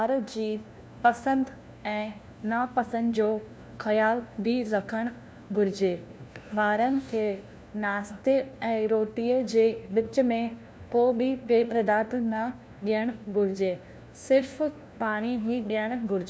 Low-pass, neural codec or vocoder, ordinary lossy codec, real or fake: none; codec, 16 kHz, 1 kbps, FunCodec, trained on LibriTTS, 50 frames a second; none; fake